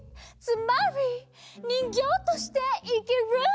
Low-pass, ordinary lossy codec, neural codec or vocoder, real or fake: none; none; none; real